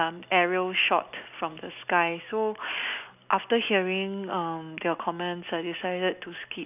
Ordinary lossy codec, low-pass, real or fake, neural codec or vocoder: none; 3.6 kHz; real; none